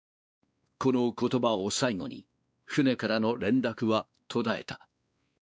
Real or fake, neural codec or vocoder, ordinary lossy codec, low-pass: fake; codec, 16 kHz, 2 kbps, X-Codec, WavLM features, trained on Multilingual LibriSpeech; none; none